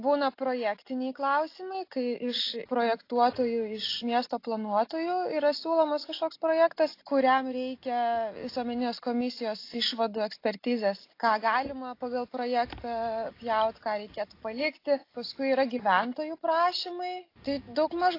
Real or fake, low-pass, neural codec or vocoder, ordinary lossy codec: real; 5.4 kHz; none; AAC, 32 kbps